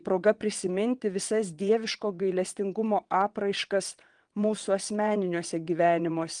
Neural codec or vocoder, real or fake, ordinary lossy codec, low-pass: vocoder, 22.05 kHz, 80 mel bands, WaveNeXt; fake; Opus, 24 kbps; 9.9 kHz